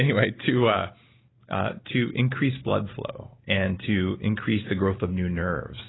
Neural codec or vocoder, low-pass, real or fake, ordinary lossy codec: none; 7.2 kHz; real; AAC, 16 kbps